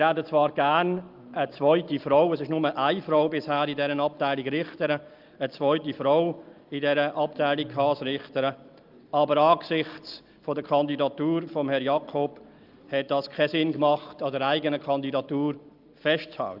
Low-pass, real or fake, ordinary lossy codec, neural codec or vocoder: 5.4 kHz; real; Opus, 32 kbps; none